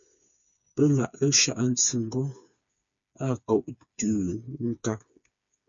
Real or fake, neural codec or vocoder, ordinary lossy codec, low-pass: fake; codec, 16 kHz, 4 kbps, FreqCodec, smaller model; MP3, 64 kbps; 7.2 kHz